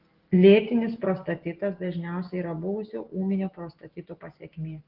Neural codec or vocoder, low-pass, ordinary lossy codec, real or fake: none; 5.4 kHz; Opus, 16 kbps; real